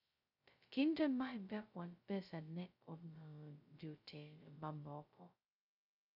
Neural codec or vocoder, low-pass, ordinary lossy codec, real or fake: codec, 16 kHz, 0.2 kbps, FocalCodec; 5.4 kHz; none; fake